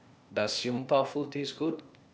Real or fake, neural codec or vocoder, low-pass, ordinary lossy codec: fake; codec, 16 kHz, 0.8 kbps, ZipCodec; none; none